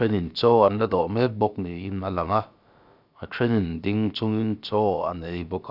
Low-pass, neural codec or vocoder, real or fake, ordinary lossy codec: 5.4 kHz; codec, 16 kHz, about 1 kbps, DyCAST, with the encoder's durations; fake; none